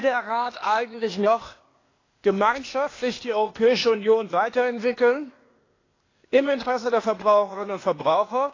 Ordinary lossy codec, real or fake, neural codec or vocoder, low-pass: AAC, 32 kbps; fake; codec, 16 kHz, 0.8 kbps, ZipCodec; 7.2 kHz